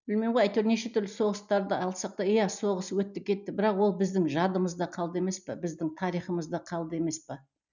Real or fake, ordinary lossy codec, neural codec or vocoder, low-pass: real; none; none; 7.2 kHz